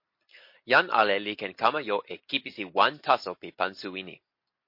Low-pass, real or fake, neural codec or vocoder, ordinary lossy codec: 5.4 kHz; real; none; MP3, 32 kbps